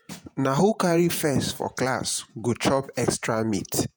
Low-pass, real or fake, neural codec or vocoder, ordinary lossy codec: none; fake; vocoder, 48 kHz, 128 mel bands, Vocos; none